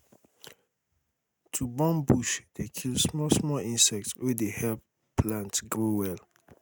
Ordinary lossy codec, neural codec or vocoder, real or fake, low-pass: none; none; real; none